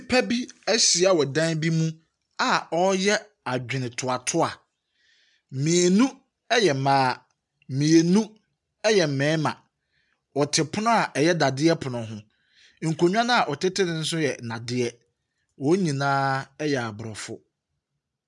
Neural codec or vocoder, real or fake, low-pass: none; real; 10.8 kHz